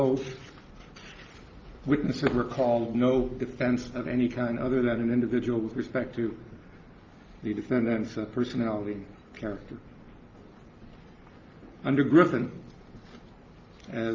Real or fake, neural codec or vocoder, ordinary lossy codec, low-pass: real; none; Opus, 24 kbps; 7.2 kHz